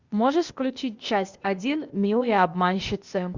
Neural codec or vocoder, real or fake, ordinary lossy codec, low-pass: codec, 16 kHz, 0.8 kbps, ZipCodec; fake; Opus, 64 kbps; 7.2 kHz